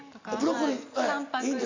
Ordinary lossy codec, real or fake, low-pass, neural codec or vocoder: AAC, 48 kbps; real; 7.2 kHz; none